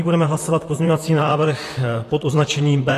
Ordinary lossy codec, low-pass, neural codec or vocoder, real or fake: AAC, 48 kbps; 14.4 kHz; vocoder, 44.1 kHz, 128 mel bands, Pupu-Vocoder; fake